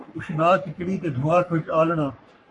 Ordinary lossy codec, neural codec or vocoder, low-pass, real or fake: MP3, 48 kbps; codec, 44.1 kHz, 3.4 kbps, Pupu-Codec; 10.8 kHz; fake